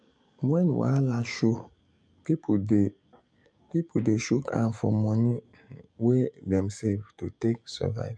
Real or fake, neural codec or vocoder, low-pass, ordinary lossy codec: fake; codec, 44.1 kHz, 7.8 kbps, DAC; 9.9 kHz; MP3, 64 kbps